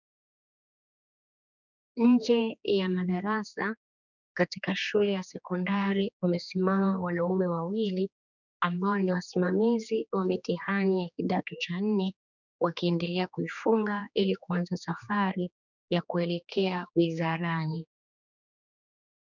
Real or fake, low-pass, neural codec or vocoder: fake; 7.2 kHz; codec, 16 kHz, 2 kbps, X-Codec, HuBERT features, trained on general audio